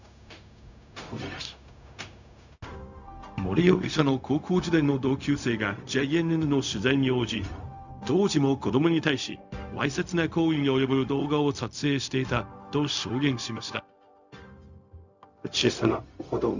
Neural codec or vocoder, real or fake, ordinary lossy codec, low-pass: codec, 16 kHz, 0.4 kbps, LongCat-Audio-Codec; fake; none; 7.2 kHz